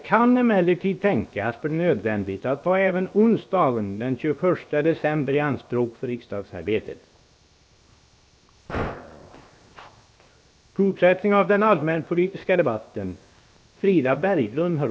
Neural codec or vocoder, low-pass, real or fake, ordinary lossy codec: codec, 16 kHz, 0.7 kbps, FocalCodec; none; fake; none